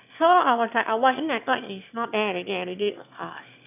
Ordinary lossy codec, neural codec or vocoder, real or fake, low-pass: none; autoencoder, 22.05 kHz, a latent of 192 numbers a frame, VITS, trained on one speaker; fake; 3.6 kHz